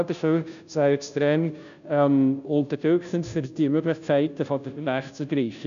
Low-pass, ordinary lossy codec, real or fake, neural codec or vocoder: 7.2 kHz; none; fake; codec, 16 kHz, 0.5 kbps, FunCodec, trained on Chinese and English, 25 frames a second